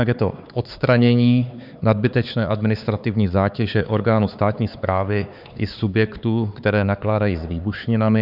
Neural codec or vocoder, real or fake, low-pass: codec, 16 kHz, 4 kbps, X-Codec, HuBERT features, trained on LibriSpeech; fake; 5.4 kHz